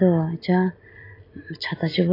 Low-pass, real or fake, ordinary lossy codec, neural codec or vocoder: 5.4 kHz; real; AAC, 24 kbps; none